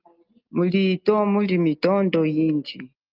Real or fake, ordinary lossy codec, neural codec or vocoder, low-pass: real; Opus, 32 kbps; none; 5.4 kHz